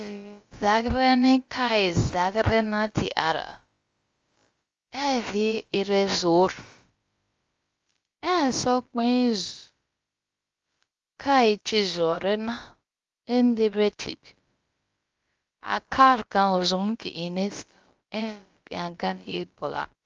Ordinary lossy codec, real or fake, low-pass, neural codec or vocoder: Opus, 32 kbps; fake; 7.2 kHz; codec, 16 kHz, about 1 kbps, DyCAST, with the encoder's durations